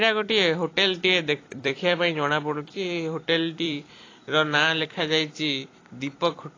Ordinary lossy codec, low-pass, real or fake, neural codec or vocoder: AAC, 32 kbps; 7.2 kHz; fake; vocoder, 44.1 kHz, 128 mel bands every 256 samples, BigVGAN v2